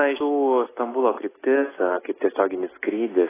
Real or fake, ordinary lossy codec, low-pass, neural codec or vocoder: real; AAC, 16 kbps; 3.6 kHz; none